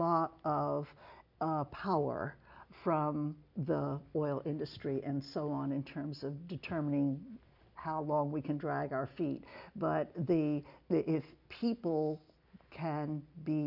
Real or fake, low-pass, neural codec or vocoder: real; 5.4 kHz; none